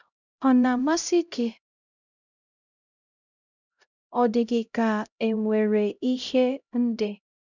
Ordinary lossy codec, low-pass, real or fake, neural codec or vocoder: none; 7.2 kHz; fake; codec, 16 kHz, 0.5 kbps, X-Codec, HuBERT features, trained on LibriSpeech